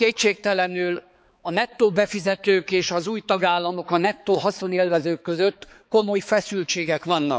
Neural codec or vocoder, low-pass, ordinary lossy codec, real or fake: codec, 16 kHz, 4 kbps, X-Codec, HuBERT features, trained on balanced general audio; none; none; fake